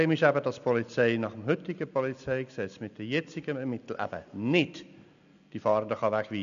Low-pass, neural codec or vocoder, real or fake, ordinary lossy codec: 7.2 kHz; none; real; none